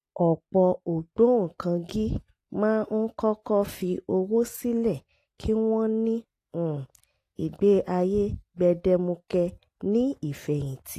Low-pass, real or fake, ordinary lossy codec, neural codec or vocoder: 14.4 kHz; real; AAC, 48 kbps; none